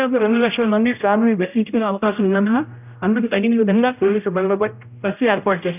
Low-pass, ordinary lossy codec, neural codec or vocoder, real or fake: 3.6 kHz; none; codec, 16 kHz, 0.5 kbps, X-Codec, HuBERT features, trained on general audio; fake